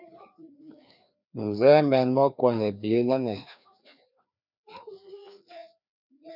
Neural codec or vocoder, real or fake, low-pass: codec, 16 kHz, 2 kbps, FreqCodec, larger model; fake; 5.4 kHz